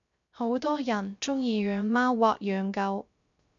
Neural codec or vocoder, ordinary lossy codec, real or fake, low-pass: codec, 16 kHz, 0.3 kbps, FocalCodec; MP3, 64 kbps; fake; 7.2 kHz